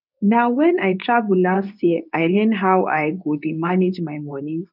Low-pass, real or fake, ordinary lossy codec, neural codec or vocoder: 5.4 kHz; fake; none; codec, 24 kHz, 0.9 kbps, WavTokenizer, medium speech release version 2